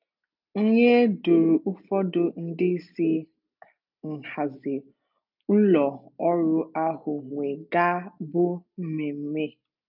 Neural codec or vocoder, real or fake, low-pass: none; real; 5.4 kHz